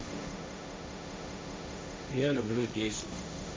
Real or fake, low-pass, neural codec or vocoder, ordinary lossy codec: fake; none; codec, 16 kHz, 1.1 kbps, Voila-Tokenizer; none